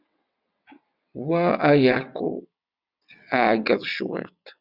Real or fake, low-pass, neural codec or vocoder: fake; 5.4 kHz; vocoder, 22.05 kHz, 80 mel bands, WaveNeXt